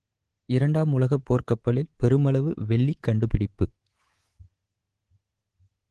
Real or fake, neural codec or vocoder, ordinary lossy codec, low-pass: fake; codec, 24 kHz, 3.1 kbps, DualCodec; Opus, 16 kbps; 10.8 kHz